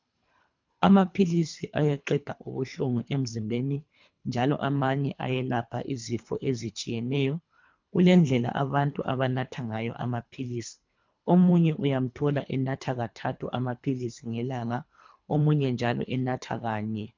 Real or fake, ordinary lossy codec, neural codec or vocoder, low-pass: fake; MP3, 64 kbps; codec, 24 kHz, 3 kbps, HILCodec; 7.2 kHz